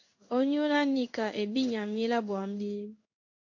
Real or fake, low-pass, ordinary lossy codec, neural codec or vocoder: fake; 7.2 kHz; AAC, 48 kbps; codec, 16 kHz in and 24 kHz out, 1 kbps, XY-Tokenizer